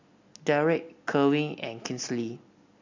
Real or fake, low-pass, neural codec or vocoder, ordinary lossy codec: real; 7.2 kHz; none; AAC, 48 kbps